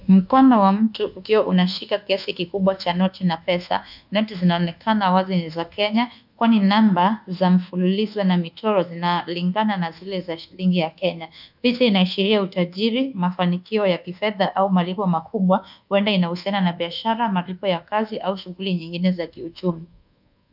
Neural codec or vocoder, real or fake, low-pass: codec, 24 kHz, 1.2 kbps, DualCodec; fake; 5.4 kHz